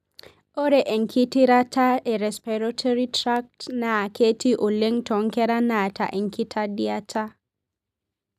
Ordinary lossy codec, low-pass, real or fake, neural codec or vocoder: none; 14.4 kHz; real; none